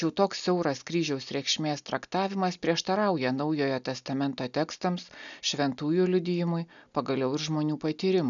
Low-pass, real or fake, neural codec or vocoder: 7.2 kHz; real; none